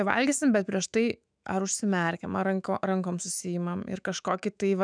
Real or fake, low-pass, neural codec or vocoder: fake; 9.9 kHz; autoencoder, 48 kHz, 128 numbers a frame, DAC-VAE, trained on Japanese speech